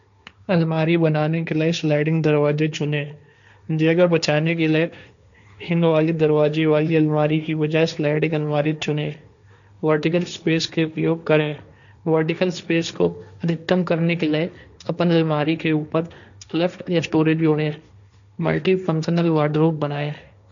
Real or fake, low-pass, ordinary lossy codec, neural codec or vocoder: fake; 7.2 kHz; none; codec, 16 kHz, 1.1 kbps, Voila-Tokenizer